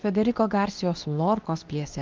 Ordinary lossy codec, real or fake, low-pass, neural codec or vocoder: Opus, 24 kbps; fake; 7.2 kHz; codec, 24 kHz, 0.9 kbps, WavTokenizer, medium speech release version 2